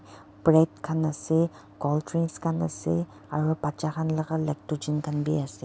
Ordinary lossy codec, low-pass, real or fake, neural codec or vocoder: none; none; real; none